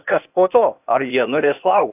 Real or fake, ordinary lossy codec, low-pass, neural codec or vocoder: fake; AAC, 32 kbps; 3.6 kHz; codec, 16 kHz, 0.8 kbps, ZipCodec